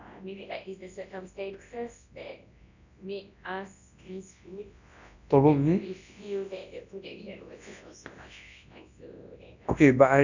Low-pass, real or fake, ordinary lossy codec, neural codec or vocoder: 7.2 kHz; fake; none; codec, 24 kHz, 0.9 kbps, WavTokenizer, large speech release